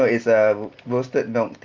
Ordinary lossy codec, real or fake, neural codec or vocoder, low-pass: Opus, 32 kbps; real; none; 7.2 kHz